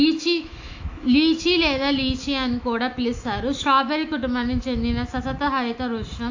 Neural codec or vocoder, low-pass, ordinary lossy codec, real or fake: autoencoder, 48 kHz, 128 numbers a frame, DAC-VAE, trained on Japanese speech; 7.2 kHz; AAC, 48 kbps; fake